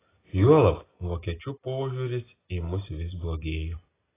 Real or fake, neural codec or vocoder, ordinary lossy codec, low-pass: real; none; AAC, 16 kbps; 3.6 kHz